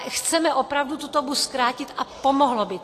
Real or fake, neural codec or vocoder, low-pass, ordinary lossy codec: real; none; 14.4 kHz; AAC, 48 kbps